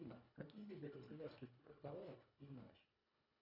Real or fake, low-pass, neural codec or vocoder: fake; 5.4 kHz; codec, 24 kHz, 1.5 kbps, HILCodec